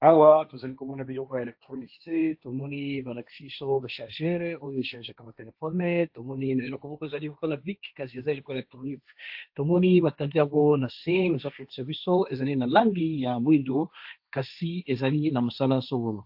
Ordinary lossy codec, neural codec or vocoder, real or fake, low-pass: AAC, 48 kbps; codec, 16 kHz, 1.1 kbps, Voila-Tokenizer; fake; 5.4 kHz